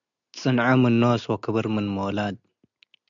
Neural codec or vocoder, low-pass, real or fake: none; 7.2 kHz; real